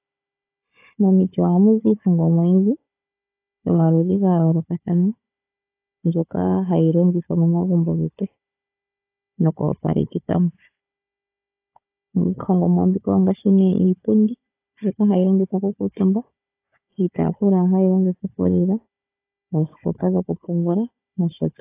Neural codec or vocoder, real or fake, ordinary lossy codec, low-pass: codec, 16 kHz, 4 kbps, FunCodec, trained on Chinese and English, 50 frames a second; fake; AAC, 24 kbps; 3.6 kHz